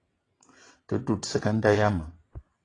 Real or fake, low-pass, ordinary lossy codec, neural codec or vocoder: fake; 9.9 kHz; AAC, 32 kbps; vocoder, 22.05 kHz, 80 mel bands, WaveNeXt